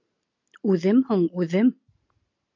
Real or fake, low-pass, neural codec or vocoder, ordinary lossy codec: real; 7.2 kHz; none; MP3, 48 kbps